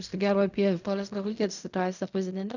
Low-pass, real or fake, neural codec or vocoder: 7.2 kHz; fake; codec, 16 kHz in and 24 kHz out, 0.4 kbps, LongCat-Audio-Codec, fine tuned four codebook decoder